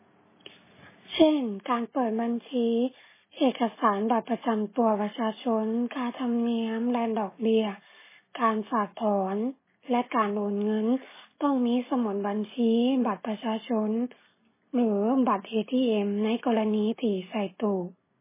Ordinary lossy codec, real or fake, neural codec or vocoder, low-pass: MP3, 16 kbps; real; none; 3.6 kHz